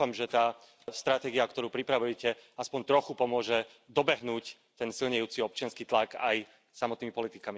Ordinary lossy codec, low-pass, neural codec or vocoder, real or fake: none; none; none; real